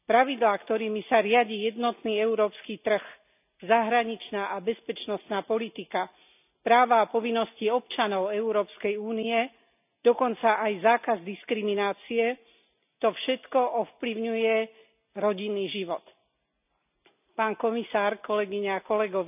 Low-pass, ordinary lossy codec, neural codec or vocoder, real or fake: 3.6 kHz; none; none; real